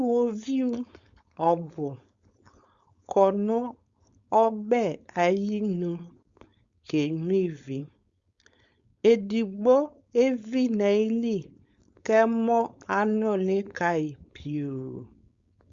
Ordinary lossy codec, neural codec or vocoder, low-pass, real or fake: Opus, 64 kbps; codec, 16 kHz, 4.8 kbps, FACodec; 7.2 kHz; fake